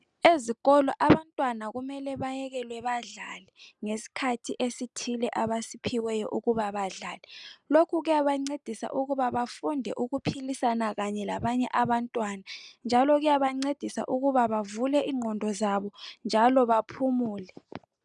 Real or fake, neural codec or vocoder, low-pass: real; none; 10.8 kHz